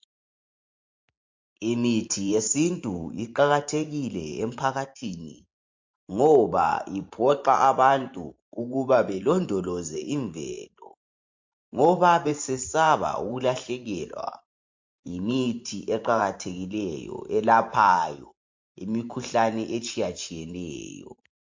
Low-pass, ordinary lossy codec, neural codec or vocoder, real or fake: 7.2 kHz; MP3, 48 kbps; vocoder, 44.1 kHz, 128 mel bands every 512 samples, BigVGAN v2; fake